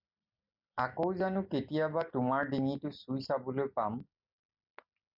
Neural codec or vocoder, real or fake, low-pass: none; real; 5.4 kHz